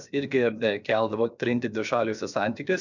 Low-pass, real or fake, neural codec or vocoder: 7.2 kHz; fake; codec, 16 kHz, 0.7 kbps, FocalCodec